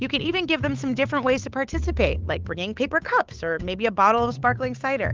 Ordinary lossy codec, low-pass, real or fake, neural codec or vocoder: Opus, 32 kbps; 7.2 kHz; fake; codec, 16 kHz, 8 kbps, FunCodec, trained on Chinese and English, 25 frames a second